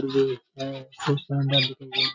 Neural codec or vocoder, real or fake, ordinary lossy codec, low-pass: none; real; none; 7.2 kHz